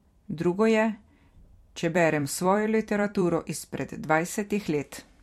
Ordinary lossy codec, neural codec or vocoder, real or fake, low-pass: MP3, 64 kbps; vocoder, 44.1 kHz, 128 mel bands every 256 samples, BigVGAN v2; fake; 19.8 kHz